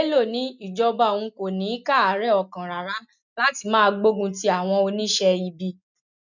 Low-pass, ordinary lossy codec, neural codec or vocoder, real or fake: 7.2 kHz; none; none; real